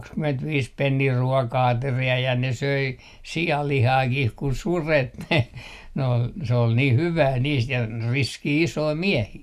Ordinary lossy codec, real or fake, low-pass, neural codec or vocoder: none; real; 14.4 kHz; none